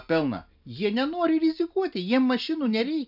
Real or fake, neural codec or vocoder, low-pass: real; none; 5.4 kHz